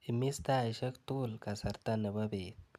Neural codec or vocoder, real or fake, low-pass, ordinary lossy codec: none; real; 14.4 kHz; none